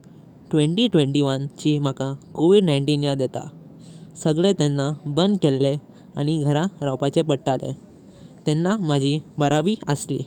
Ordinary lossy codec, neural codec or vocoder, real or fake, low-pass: none; codec, 44.1 kHz, 7.8 kbps, DAC; fake; 19.8 kHz